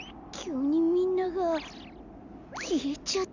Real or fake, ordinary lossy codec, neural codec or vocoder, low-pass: real; none; none; 7.2 kHz